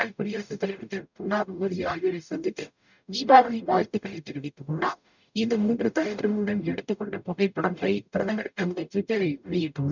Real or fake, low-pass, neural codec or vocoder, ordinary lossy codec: fake; 7.2 kHz; codec, 44.1 kHz, 0.9 kbps, DAC; none